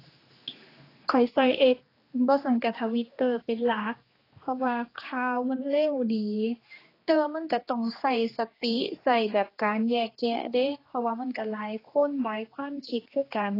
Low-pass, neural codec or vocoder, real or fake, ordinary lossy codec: 5.4 kHz; codec, 16 kHz, 2 kbps, X-Codec, HuBERT features, trained on general audio; fake; AAC, 24 kbps